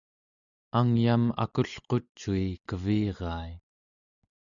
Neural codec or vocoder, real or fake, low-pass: none; real; 7.2 kHz